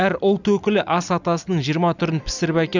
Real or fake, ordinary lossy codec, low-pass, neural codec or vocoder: real; none; 7.2 kHz; none